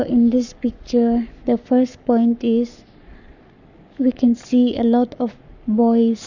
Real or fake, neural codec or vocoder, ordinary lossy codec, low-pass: fake; codec, 44.1 kHz, 7.8 kbps, Pupu-Codec; none; 7.2 kHz